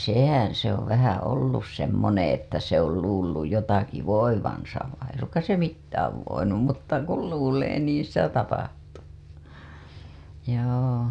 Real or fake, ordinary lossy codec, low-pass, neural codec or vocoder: real; none; none; none